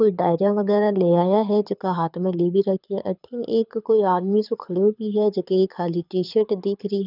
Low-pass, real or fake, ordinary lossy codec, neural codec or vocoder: 5.4 kHz; fake; none; codec, 24 kHz, 6 kbps, HILCodec